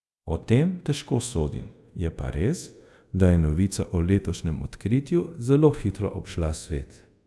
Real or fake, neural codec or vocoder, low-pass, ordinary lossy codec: fake; codec, 24 kHz, 0.5 kbps, DualCodec; none; none